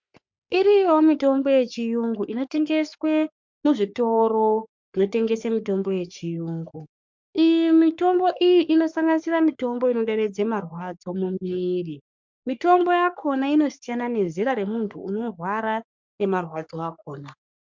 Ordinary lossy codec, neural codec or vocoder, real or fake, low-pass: MP3, 64 kbps; codec, 44.1 kHz, 3.4 kbps, Pupu-Codec; fake; 7.2 kHz